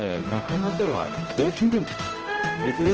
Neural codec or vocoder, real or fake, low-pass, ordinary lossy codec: codec, 16 kHz, 0.5 kbps, X-Codec, HuBERT features, trained on general audio; fake; 7.2 kHz; Opus, 16 kbps